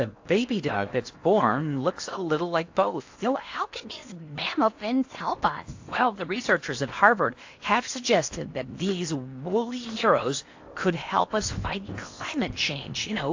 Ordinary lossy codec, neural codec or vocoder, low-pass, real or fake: AAC, 48 kbps; codec, 16 kHz in and 24 kHz out, 0.8 kbps, FocalCodec, streaming, 65536 codes; 7.2 kHz; fake